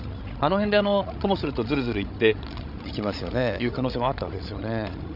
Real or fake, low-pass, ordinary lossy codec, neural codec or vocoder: fake; 5.4 kHz; none; codec, 16 kHz, 16 kbps, FreqCodec, larger model